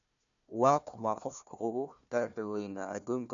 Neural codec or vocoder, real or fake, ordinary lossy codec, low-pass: codec, 16 kHz, 1 kbps, FunCodec, trained on Chinese and English, 50 frames a second; fake; none; 7.2 kHz